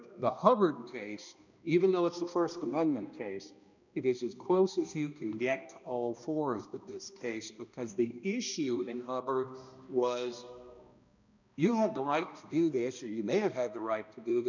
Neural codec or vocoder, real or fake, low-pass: codec, 16 kHz, 1 kbps, X-Codec, HuBERT features, trained on balanced general audio; fake; 7.2 kHz